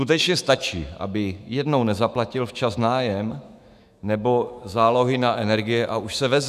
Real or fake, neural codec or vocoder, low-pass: fake; autoencoder, 48 kHz, 128 numbers a frame, DAC-VAE, trained on Japanese speech; 14.4 kHz